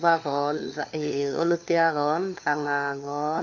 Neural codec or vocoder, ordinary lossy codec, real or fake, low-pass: codec, 16 kHz, 4 kbps, FunCodec, trained on LibriTTS, 50 frames a second; none; fake; 7.2 kHz